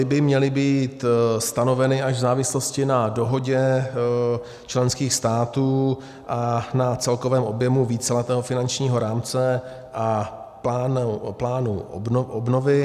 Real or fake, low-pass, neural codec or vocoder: real; 14.4 kHz; none